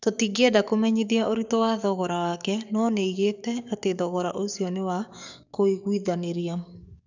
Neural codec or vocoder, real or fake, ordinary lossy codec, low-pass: codec, 44.1 kHz, 7.8 kbps, DAC; fake; none; 7.2 kHz